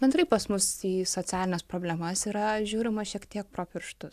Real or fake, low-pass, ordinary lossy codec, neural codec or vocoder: real; 14.4 kHz; AAC, 96 kbps; none